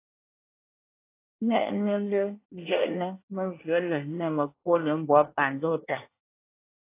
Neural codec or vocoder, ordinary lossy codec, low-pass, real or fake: codec, 24 kHz, 1 kbps, SNAC; AAC, 24 kbps; 3.6 kHz; fake